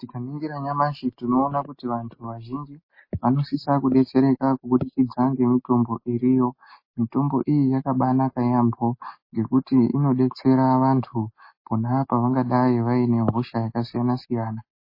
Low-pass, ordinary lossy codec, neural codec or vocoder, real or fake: 5.4 kHz; MP3, 24 kbps; none; real